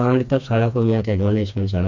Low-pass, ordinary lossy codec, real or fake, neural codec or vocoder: 7.2 kHz; none; fake; codec, 16 kHz, 2 kbps, FreqCodec, smaller model